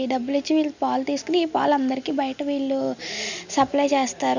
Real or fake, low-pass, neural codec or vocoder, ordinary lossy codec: real; 7.2 kHz; none; none